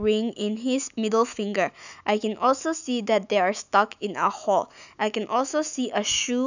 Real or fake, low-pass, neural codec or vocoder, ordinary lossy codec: fake; 7.2 kHz; autoencoder, 48 kHz, 128 numbers a frame, DAC-VAE, trained on Japanese speech; none